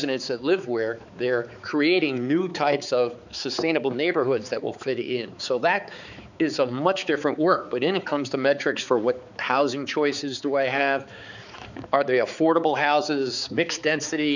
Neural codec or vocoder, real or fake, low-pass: codec, 16 kHz, 4 kbps, X-Codec, HuBERT features, trained on balanced general audio; fake; 7.2 kHz